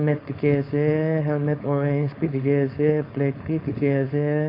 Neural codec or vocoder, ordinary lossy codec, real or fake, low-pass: codec, 16 kHz, 4.8 kbps, FACodec; AAC, 32 kbps; fake; 5.4 kHz